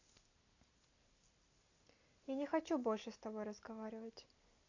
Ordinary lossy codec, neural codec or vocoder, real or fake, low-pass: AAC, 48 kbps; none; real; 7.2 kHz